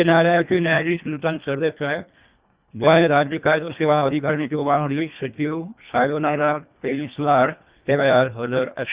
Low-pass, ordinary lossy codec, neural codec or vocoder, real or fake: 3.6 kHz; Opus, 64 kbps; codec, 24 kHz, 1.5 kbps, HILCodec; fake